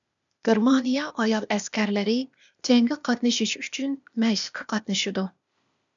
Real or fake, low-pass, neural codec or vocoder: fake; 7.2 kHz; codec, 16 kHz, 0.8 kbps, ZipCodec